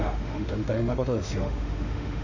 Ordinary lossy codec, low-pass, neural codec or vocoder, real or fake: none; 7.2 kHz; autoencoder, 48 kHz, 32 numbers a frame, DAC-VAE, trained on Japanese speech; fake